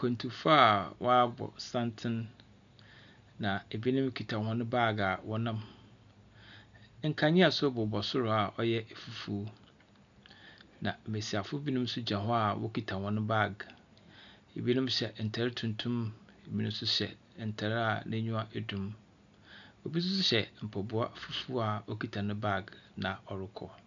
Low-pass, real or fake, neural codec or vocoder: 7.2 kHz; real; none